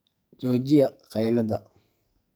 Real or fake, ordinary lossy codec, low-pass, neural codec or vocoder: fake; none; none; codec, 44.1 kHz, 2.6 kbps, SNAC